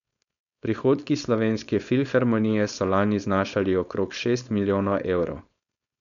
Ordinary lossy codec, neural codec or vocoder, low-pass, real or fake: none; codec, 16 kHz, 4.8 kbps, FACodec; 7.2 kHz; fake